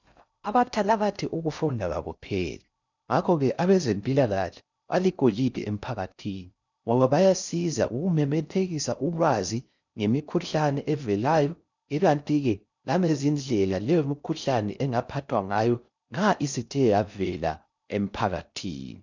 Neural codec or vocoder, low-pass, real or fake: codec, 16 kHz in and 24 kHz out, 0.6 kbps, FocalCodec, streaming, 2048 codes; 7.2 kHz; fake